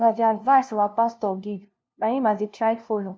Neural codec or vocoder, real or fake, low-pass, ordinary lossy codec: codec, 16 kHz, 0.5 kbps, FunCodec, trained on LibriTTS, 25 frames a second; fake; none; none